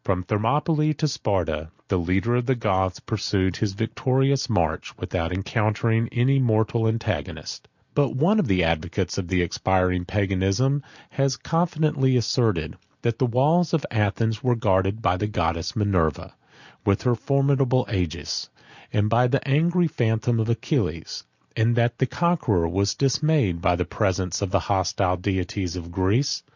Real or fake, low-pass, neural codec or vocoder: real; 7.2 kHz; none